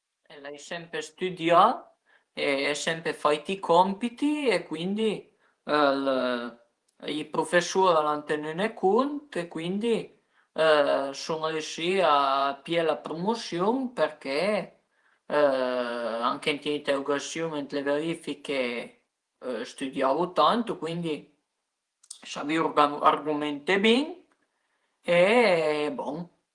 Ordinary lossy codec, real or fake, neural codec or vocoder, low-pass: Opus, 24 kbps; fake; vocoder, 48 kHz, 128 mel bands, Vocos; 10.8 kHz